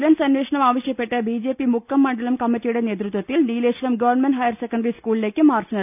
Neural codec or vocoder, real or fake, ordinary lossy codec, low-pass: none; real; none; 3.6 kHz